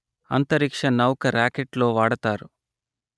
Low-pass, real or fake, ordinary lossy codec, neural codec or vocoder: none; real; none; none